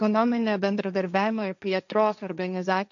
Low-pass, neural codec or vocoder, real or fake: 7.2 kHz; codec, 16 kHz, 1.1 kbps, Voila-Tokenizer; fake